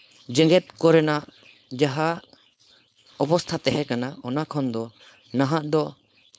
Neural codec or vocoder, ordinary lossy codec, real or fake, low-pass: codec, 16 kHz, 4.8 kbps, FACodec; none; fake; none